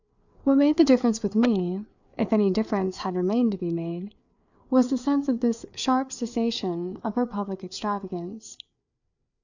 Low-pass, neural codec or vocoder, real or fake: 7.2 kHz; codec, 16 kHz, 4 kbps, FreqCodec, larger model; fake